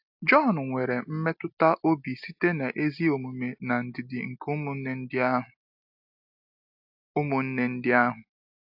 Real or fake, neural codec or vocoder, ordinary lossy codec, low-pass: real; none; AAC, 48 kbps; 5.4 kHz